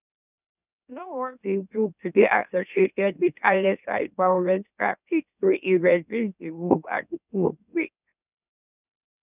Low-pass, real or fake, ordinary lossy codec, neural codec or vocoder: 3.6 kHz; fake; none; autoencoder, 44.1 kHz, a latent of 192 numbers a frame, MeloTTS